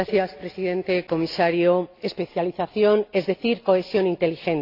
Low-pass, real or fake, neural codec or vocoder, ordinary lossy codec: 5.4 kHz; real; none; AAC, 32 kbps